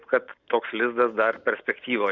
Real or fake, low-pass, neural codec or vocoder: real; 7.2 kHz; none